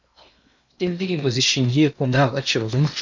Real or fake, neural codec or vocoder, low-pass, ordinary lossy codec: fake; codec, 16 kHz in and 24 kHz out, 0.8 kbps, FocalCodec, streaming, 65536 codes; 7.2 kHz; MP3, 64 kbps